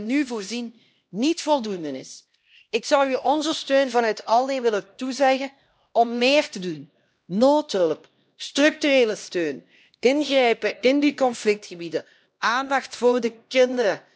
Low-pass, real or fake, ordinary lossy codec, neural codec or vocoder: none; fake; none; codec, 16 kHz, 1 kbps, X-Codec, HuBERT features, trained on LibriSpeech